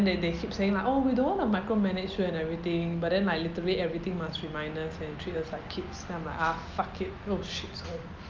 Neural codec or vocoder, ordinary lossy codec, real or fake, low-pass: none; Opus, 24 kbps; real; 7.2 kHz